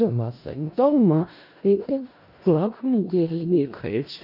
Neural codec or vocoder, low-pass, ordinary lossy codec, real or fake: codec, 16 kHz in and 24 kHz out, 0.4 kbps, LongCat-Audio-Codec, four codebook decoder; 5.4 kHz; none; fake